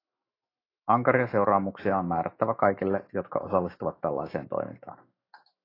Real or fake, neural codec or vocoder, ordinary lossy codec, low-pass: fake; autoencoder, 48 kHz, 128 numbers a frame, DAC-VAE, trained on Japanese speech; AAC, 24 kbps; 5.4 kHz